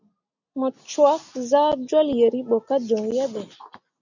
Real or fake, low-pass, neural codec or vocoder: real; 7.2 kHz; none